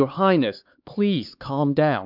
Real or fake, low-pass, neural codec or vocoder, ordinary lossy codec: fake; 5.4 kHz; codec, 16 kHz, 4 kbps, X-Codec, HuBERT features, trained on LibriSpeech; MP3, 48 kbps